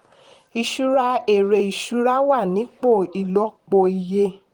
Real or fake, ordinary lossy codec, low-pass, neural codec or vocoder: fake; Opus, 24 kbps; 19.8 kHz; vocoder, 44.1 kHz, 128 mel bands, Pupu-Vocoder